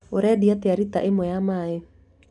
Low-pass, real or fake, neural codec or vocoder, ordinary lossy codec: 10.8 kHz; real; none; none